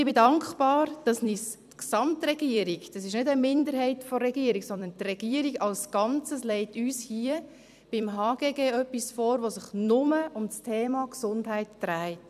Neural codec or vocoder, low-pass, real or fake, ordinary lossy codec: vocoder, 44.1 kHz, 128 mel bands every 256 samples, BigVGAN v2; 14.4 kHz; fake; none